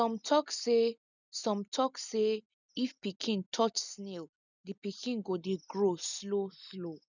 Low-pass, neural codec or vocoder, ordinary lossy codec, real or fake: 7.2 kHz; none; none; real